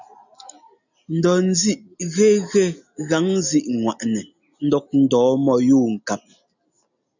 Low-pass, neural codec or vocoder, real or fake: 7.2 kHz; none; real